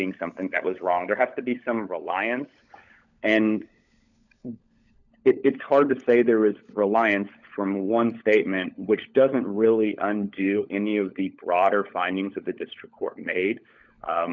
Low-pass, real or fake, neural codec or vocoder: 7.2 kHz; fake; codec, 16 kHz, 16 kbps, FunCodec, trained on LibriTTS, 50 frames a second